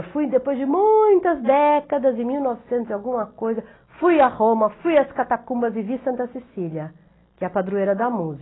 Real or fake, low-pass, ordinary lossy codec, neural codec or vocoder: real; 7.2 kHz; AAC, 16 kbps; none